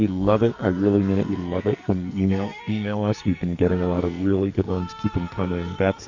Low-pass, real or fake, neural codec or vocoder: 7.2 kHz; fake; codec, 44.1 kHz, 2.6 kbps, SNAC